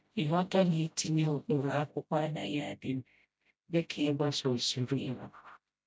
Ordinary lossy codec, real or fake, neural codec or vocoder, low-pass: none; fake; codec, 16 kHz, 0.5 kbps, FreqCodec, smaller model; none